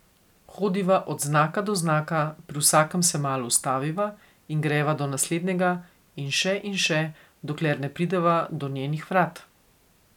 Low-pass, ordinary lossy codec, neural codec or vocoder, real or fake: 19.8 kHz; none; none; real